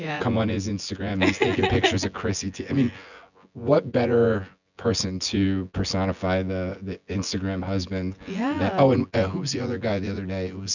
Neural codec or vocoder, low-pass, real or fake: vocoder, 24 kHz, 100 mel bands, Vocos; 7.2 kHz; fake